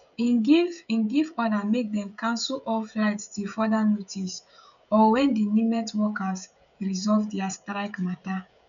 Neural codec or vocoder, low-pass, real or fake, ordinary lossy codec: codec, 16 kHz, 16 kbps, FreqCodec, smaller model; 7.2 kHz; fake; none